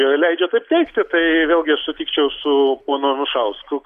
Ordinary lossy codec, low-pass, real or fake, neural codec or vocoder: AAC, 96 kbps; 14.4 kHz; real; none